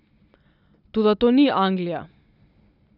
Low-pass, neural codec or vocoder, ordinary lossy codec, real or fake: 5.4 kHz; none; none; real